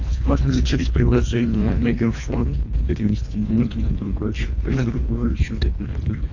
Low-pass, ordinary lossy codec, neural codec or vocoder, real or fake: 7.2 kHz; AAC, 48 kbps; codec, 24 kHz, 1.5 kbps, HILCodec; fake